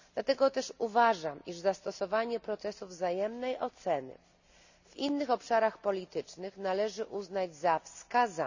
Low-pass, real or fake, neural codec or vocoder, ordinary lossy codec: 7.2 kHz; real; none; none